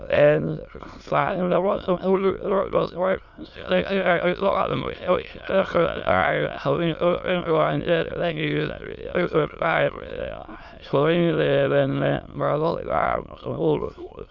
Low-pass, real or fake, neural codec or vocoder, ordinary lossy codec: 7.2 kHz; fake; autoencoder, 22.05 kHz, a latent of 192 numbers a frame, VITS, trained on many speakers; none